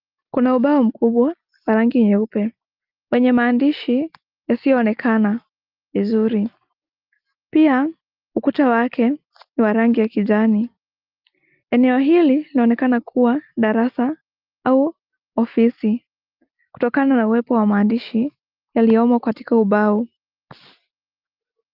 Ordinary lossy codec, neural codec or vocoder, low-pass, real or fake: Opus, 32 kbps; none; 5.4 kHz; real